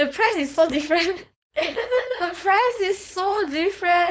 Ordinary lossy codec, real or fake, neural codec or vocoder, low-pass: none; fake; codec, 16 kHz, 4.8 kbps, FACodec; none